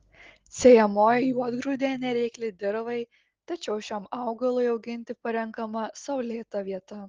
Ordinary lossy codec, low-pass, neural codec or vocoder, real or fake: Opus, 16 kbps; 7.2 kHz; none; real